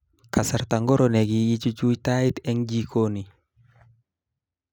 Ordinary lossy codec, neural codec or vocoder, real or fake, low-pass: none; none; real; 19.8 kHz